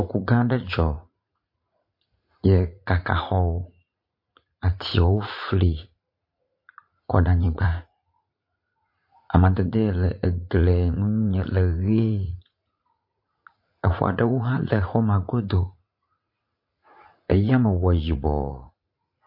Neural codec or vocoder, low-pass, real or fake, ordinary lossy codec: vocoder, 44.1 kHz, 80 mel bands, Vocos; 5.4 kHz; fake; MP3, 32 kbps